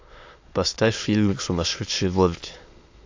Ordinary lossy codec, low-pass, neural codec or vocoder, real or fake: AAC, 48 kbps; 7.2 kHz; autoencoder, 22.05 kHz, a latent of 192 numbers a frame, VITS, trained on many speakers; fake